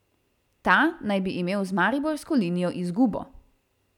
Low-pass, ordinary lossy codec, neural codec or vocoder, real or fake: 19.8 kHz; none; none; real